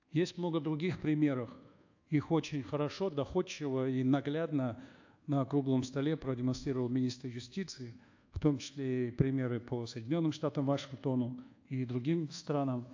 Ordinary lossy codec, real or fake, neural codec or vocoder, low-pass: AAC, 48 kbps; fake; codec, 24 kHz, 1.2 kbps, DualCodec; 7.2 kHz